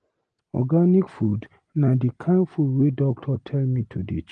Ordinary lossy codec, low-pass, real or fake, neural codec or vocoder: Opus, 24 kbps; 9.9 kHz; real; none